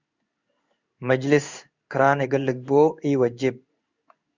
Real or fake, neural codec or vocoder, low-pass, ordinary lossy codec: fake; codec, 16 kHz in and 24 kHz out, 1 kbps, XY-Tokenizer; 7.2 kHz; Opus, 64 kbps